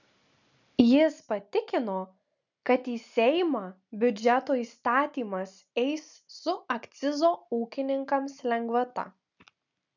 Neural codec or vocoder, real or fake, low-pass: none; real; 7.2 kHz